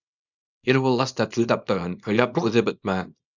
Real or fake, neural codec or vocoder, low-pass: fake; codec, 24 kHz, 0.9 kbps, WavTokenizer, small release; 7.2 kHz